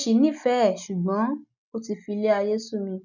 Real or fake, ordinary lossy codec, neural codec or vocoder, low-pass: real; none; none; 7.2 kHz